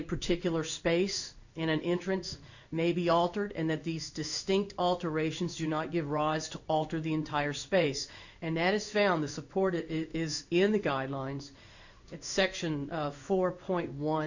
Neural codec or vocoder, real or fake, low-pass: codec, 16 kHz in and 24 kHz out, 1 kbps, XY-Tokenizer; fake; 7.2 kHz